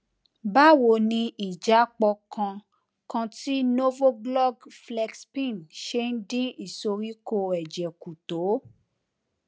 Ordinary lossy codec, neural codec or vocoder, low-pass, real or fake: none; none; none; real